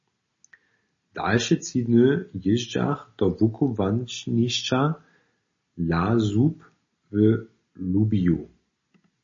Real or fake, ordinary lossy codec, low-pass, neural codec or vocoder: real; MP3, 32 kbps; 7.2 kHz; none